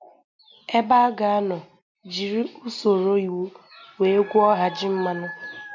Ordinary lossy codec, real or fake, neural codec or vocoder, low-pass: MP3, 64 kbps; real; none; 7.2 kHz